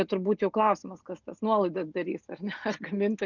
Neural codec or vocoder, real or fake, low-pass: none; real; 7.2 kHz